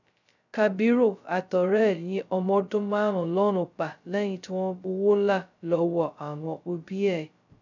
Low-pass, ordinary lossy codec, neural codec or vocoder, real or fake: 7.2 kHz; none; codec, 16 kHz, 0.2 kbps, FocalCodec; fake